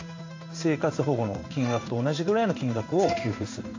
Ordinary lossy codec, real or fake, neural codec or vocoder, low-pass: AAC, 48 kbps; fake; codec, 16 kHz in and 24 kHz out, 1 kbps, XY-Tokenizer; 7.2 kHz